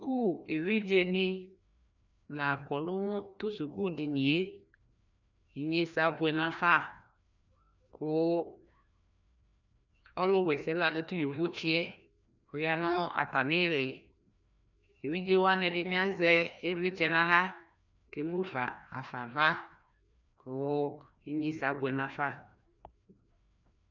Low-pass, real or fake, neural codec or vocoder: 7.2 kHz; fake; codec, 16 kHz, 1 kbps, FreqCodec, larger model